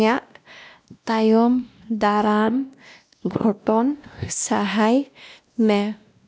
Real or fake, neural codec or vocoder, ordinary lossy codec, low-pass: fake; codec, 16 kHz, 1 kbps, X-Codec, WavLM features, trained on Multilingual LibriSpeech; none; none